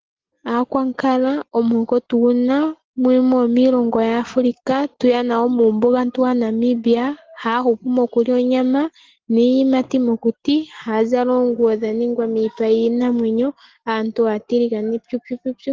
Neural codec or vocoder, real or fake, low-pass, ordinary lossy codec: none; real; 7.2 kHz; Opus, 16 kbps